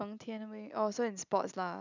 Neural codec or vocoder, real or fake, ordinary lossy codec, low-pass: none; real; none; 7.2 kHz